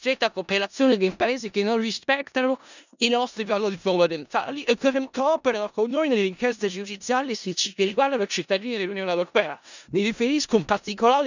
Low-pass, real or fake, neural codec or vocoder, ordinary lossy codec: 7.2 kHz; fake; codec, 16 kHz in and 24 kHz out, 0.4 kbps, LongCat-Audio-Codec, four codebook decoder; none